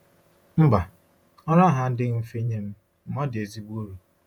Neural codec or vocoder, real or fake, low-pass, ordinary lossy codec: vocoder, 48 kHz, 128 mel bands, Vocos; fake; 19.8 kHz; none